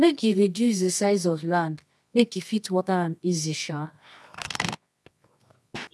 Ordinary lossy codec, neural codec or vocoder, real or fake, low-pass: none; codec, 24 kHz, 0.9 kbps, WavTokenizer, medium music audio release; fake; none